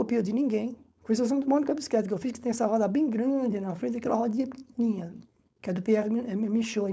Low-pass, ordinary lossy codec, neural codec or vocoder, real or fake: none; none; codec, 16 kHz, 4.8 kbps, FACodec; fake